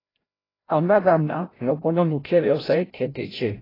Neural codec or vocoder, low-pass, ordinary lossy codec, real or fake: codec, 16 kHz, 0.5 kbps, FreqCodec, larger model; 5.4 kHz; AAC, 24 kbps; fake